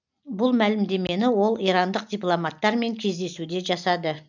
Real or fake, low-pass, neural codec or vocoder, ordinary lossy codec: real; 7.2 kHz; none; none